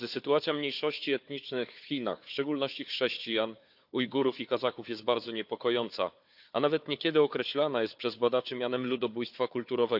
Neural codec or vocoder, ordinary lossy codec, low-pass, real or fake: codec, 16 kHz, 4 kbps, FunCodec, trained on Chinese and English, 50 frames a second; AAC, 48 kbps; 5.4 kHz; fake